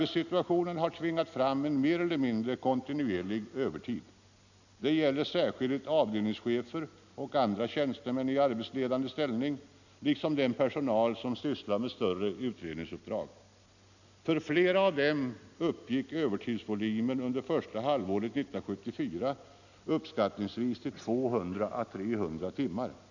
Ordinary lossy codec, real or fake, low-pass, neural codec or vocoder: none; real; 7.2 kHz; none